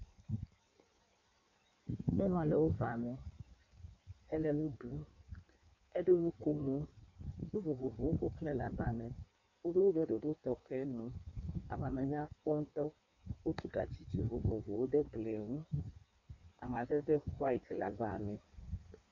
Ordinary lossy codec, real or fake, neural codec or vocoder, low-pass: MP3, 48 kbps; fake; codec, 16 kHz in and 24 kHz out, 1.1 kbps, FireRedTTS-2 codec; 7.2 kHz